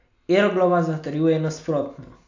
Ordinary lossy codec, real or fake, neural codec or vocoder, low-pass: AAC, 48 kbps; real; none; 7.2 kHz